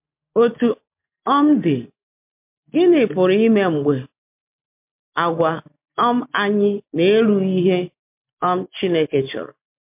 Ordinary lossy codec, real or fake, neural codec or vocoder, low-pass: MP3, 32 kbps; real; none; 3.6 kHz